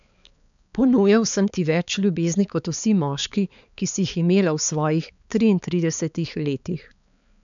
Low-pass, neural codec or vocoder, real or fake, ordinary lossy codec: 7.2 kHz; codec, 16 kHz, 4 kbps, X-Codec, HuBERT features, trained on balanced general audio; fake; none